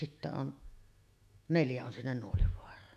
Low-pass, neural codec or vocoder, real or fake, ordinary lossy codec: 14.4 kHz; autoencoder, 48 kHz, 128 numbers a frame, DAC-VAE, trained on Japanese speech; fake; none